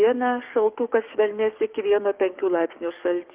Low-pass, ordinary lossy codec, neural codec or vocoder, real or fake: 3.6 kHz; Opus, 32 kbps; codec, 44.1 kHz, 7.8 kbps, DAC; fake